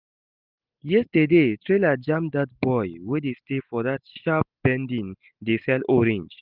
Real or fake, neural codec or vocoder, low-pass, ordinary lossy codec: real; none; 5.4 kHz; Opus, 24 kbps